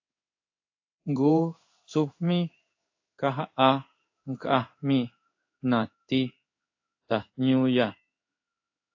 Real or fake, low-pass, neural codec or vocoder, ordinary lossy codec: fake; 7.2 kHz; codec, 16 kHz in and 24 kHz out, 1 kbps, XY-Tokenizer; AAC, 48 kbps